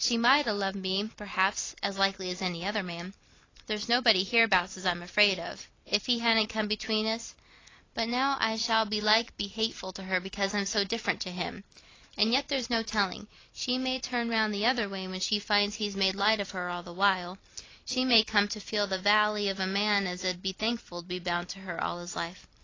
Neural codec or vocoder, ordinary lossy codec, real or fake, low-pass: vocoder, 44.1 kHz, 128 mel bands every 256 samples, BigVGAN v2; AAC, 32 kbps; fake; 7.2 kHz